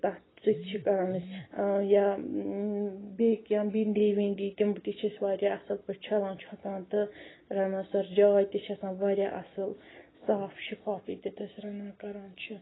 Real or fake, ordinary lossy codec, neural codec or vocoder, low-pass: real; AAC, 16 kbps; none; 7.2 kHz